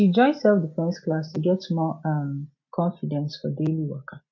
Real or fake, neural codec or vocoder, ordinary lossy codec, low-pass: real; none; MP3, 48 kbps; 7.2 kHz